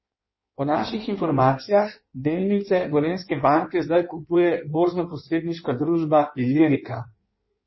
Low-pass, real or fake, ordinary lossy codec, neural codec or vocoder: 7.2 kHz; fake; MP3, 24 kbps; codec, 16 kHz in and 24 kHz out, 1.1 kbps, FireRedTTS-2 codec